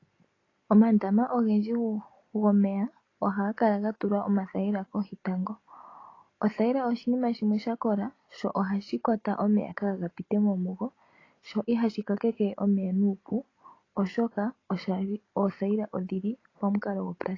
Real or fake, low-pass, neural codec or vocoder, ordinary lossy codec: real; 7.2 kHz; none; AAC, 32 kbps